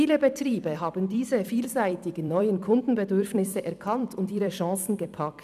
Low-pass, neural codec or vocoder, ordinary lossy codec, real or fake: 14.4 kHz; vocoder, 44.1 kHz, 128 mel bands every 256 samples, BigVGAN v2; none; fake